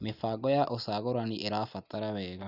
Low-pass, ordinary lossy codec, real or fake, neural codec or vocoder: 5.4 kHz; none; real; none